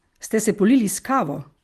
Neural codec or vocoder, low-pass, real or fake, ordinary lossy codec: none; 14.4 kHz; real; Opus, 24 kbps